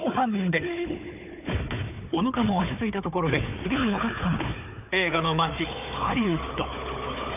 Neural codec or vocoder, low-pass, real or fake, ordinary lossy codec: codec, 16 kHz, 4 kbps, FunCodec, trained on Chinese and English, 50 frames a second; 3.6 kHz; fake; none